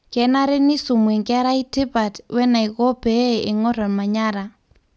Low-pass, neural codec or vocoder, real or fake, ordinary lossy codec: none; none; real; none